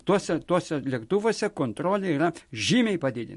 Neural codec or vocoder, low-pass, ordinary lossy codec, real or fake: none; 14.4 kHz; MP3, 48 kbps; real